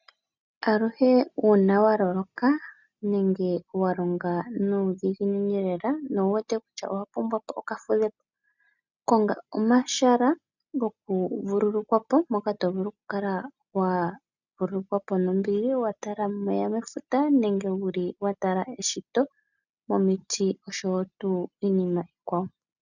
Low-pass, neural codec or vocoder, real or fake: 7.2 kHz; none; real